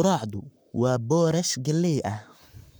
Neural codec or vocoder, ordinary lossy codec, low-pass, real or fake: codec, 44.1 kHz, 7.8 kbps, Pupu-Codec; none; none; fake